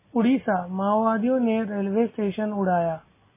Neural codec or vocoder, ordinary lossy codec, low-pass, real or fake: none; MP3, 16 kbps; 3.6 kHz; real